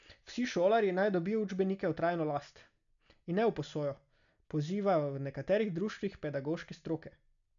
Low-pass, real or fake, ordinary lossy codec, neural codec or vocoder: 7.2 kHz; real; none; none